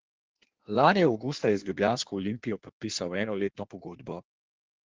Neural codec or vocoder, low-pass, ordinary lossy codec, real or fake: codec, 16 kHz in and 24 kHz out, 1.1 kbps, FireRedTTS-2 codec; 7.2 kHz; Opus, 16 kbps; fake